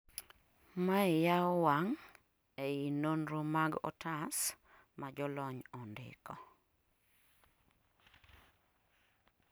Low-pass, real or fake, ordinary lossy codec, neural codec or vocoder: none; real; none; none